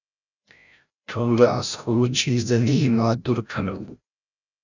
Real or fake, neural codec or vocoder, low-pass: fake; codec, 16 kHz, 0.5 kbps, FreqCodec, larger model; 7.2 kHz